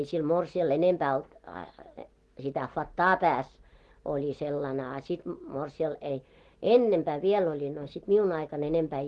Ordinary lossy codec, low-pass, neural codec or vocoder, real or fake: Opus, 16 kbps; 10.8 kHz; none; real